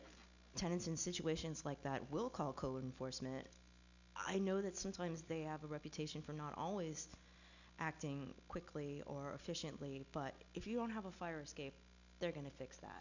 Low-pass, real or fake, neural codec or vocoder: 7.2 kHz; real; none